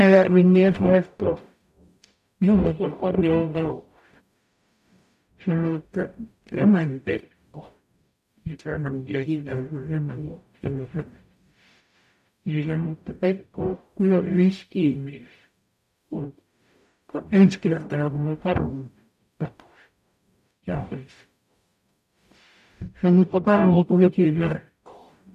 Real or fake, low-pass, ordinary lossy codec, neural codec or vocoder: fake; 14.4 kHz; none; codec, 44.1 kHz, 0.9 kbps, DAC